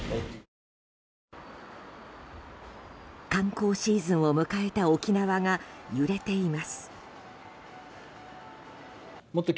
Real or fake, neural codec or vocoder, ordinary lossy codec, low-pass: real; none; none; none